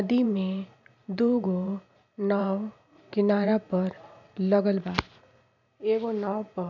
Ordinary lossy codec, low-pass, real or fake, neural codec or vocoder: none; 7.2 kHz; fake; vocoder, 44.1 kHz, 128 mel bands every 512 samples, BigVGAN v2